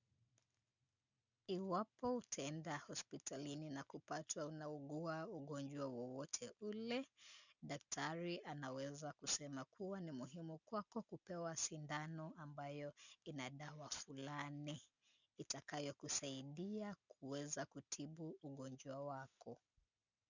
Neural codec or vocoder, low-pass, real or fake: none; 7.2 kHz; real